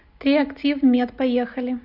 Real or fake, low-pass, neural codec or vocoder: real; 5.4 kHz; none